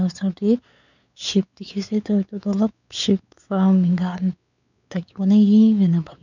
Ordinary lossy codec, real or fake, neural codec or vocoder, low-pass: none; fake; codec, 16 kHz, 4 kbps, X-Codec, WavLM features, trained on Multilingual LibriSpeech; 7.2 kHz